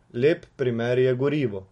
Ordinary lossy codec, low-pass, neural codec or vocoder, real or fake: MP3, 48 kbps; 19.8 kHz; none; real